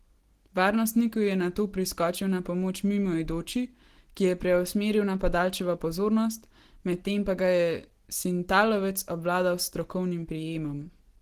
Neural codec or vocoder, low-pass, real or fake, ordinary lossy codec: none; 14.4 kHz; real; Opus, 16 kbps